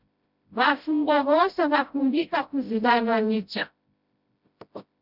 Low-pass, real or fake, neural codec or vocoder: 5.4 kHz; fake; codec, 16 kHz, 0.5 kbps, FreqCodec, smaller model